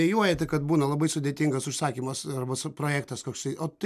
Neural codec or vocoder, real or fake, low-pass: none; real; 14.4 kHz